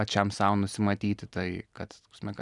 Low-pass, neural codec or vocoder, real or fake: 10.8 kHz; vocoder, 44.1 kHz, 128 mel bands every 256 samples, BigVGAN v2; fake